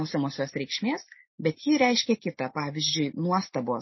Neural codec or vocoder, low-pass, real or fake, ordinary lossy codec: none; 7.2 kHz; real; MP3, 24 kbps